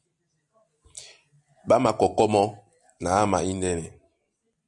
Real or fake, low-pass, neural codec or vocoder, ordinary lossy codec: real; 10.8 kHz; none; MP3, 96 kbps